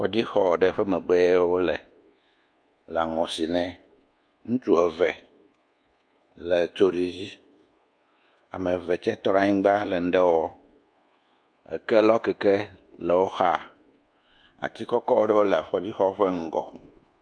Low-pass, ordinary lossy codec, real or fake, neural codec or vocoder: 9.9 kHz; AAC, 64 kbps; fake; codec, 24 kHz, 1.2 kbps, DualCodec